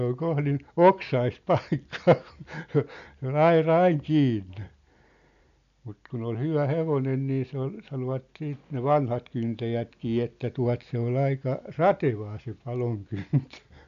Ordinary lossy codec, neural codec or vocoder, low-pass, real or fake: none; none; 7.2 kHz; real